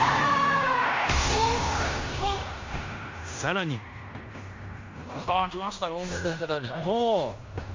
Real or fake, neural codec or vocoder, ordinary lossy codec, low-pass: fake; codec, 16 kHz in and 24 kHz out, 0.9 kbps, LongCat-Audio-Codec, fine tuned four codebook decoder; MP3, 48 kbps; 7.2 kHz